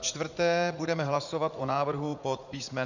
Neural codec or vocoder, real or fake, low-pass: vocoder, 44.1 kHz, 80 mel bands, Vocos; fake; 7.2 kHz